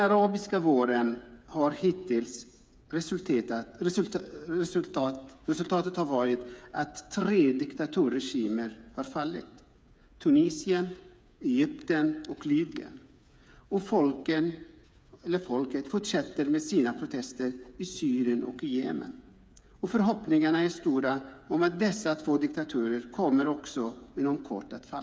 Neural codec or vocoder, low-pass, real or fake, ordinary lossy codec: codec, 16 kHz, 8 kbps, FreqCodec, smaller model; none; fake; none